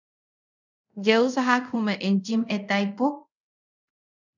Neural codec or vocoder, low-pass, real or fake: codec, 24 kHz, 0.5 kbps, DualCodec; 7.2 kHz; fake